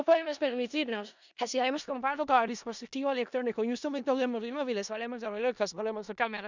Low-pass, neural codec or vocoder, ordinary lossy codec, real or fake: 7.2 kHz; codec, 16 kHz in and 24 kHz out, 0.4 kbps, LongCat-Audio-Codec, four codebook decoder; none; fake